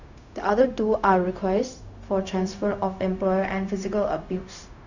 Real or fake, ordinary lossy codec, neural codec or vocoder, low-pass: fake; none; codec, 16 kHz, 0.4 kbps, LongCat-Audio-Codec; 7.2 kHz